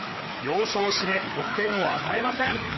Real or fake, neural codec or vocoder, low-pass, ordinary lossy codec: fake; codec, 16 kHz, 4 kbps, FreqCodec, larger model; 7.2 kHz; MP3, 24 kbps